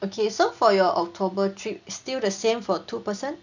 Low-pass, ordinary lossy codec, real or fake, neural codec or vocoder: 7.2 kHz; none; real; none